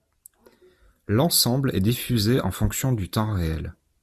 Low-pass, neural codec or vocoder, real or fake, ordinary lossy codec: 14.4 kHz; none; real; Opus, 64 kbps